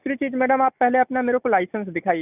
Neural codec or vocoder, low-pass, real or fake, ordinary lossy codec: none; 3.6 kHz; real; none